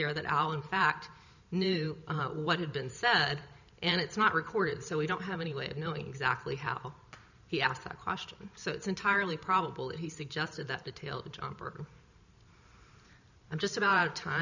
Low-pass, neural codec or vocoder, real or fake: 7.2 kHz; vocoder, 44.1 kHz, 128 mel bands every 512 samples, BigVGAN v2; fake